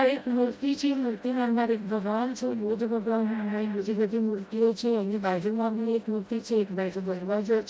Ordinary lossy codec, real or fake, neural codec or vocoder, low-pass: none; fake; codec, 16 kHz, 0.5 kbps, FreqCodec, smaller model; none